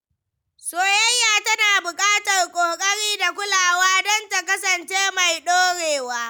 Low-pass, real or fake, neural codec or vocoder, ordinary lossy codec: none; real; none; none